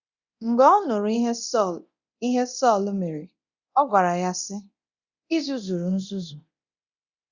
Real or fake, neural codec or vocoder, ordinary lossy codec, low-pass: fake; codec, 24 kHz, 0.9 kbps, DualCodec; Opus, 64 kbps; 7.2 kHz